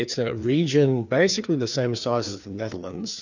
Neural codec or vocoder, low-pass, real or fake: codec, 16 kHz, 2 kbps, FreqCodec, larger model; 7.2 kHz; fake